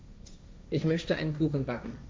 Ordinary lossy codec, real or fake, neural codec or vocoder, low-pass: none; fake; codec, 16 kHz, 1.1 kbps, Voila-Tokenizer; none